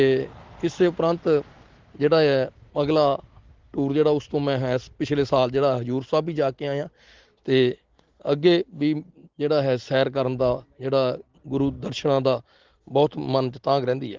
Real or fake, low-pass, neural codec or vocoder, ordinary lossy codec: real; 7.2 kHz; none; Opus, 16 kbps